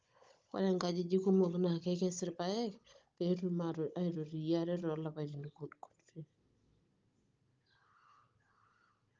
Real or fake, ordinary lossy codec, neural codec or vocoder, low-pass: fake; Opus, 24 kbps; codec, 16 kHz, 16 kbps, FunCodec, trained on Chinese and English, 50 frames a second; 7.2 kHz